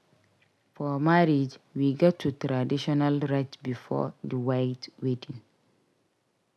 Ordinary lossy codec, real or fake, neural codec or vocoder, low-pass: none; real; none; none